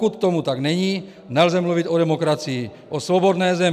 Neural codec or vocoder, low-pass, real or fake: none; 14.4 kHz; real